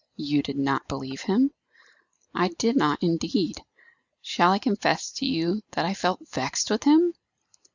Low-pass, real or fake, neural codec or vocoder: 7.2 kHz; real; none